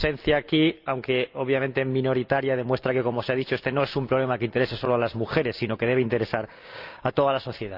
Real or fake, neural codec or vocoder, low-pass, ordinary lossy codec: real; none; 5.4 kHz; Opus, 24 kbps